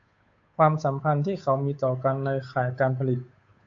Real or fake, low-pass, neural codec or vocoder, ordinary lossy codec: fake; 7.2 kHz; codec, 16 kHz, 8 kbps, FunCodec, trained on Chinese and English, 25 frames a second; AAC, 48 kbps